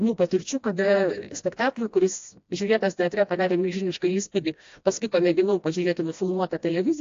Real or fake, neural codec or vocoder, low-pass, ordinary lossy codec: fake; codec, 16 kHz, 1 kbps, FreqCodec, smaller model; 7.2 kHz; AAC, 48 kbps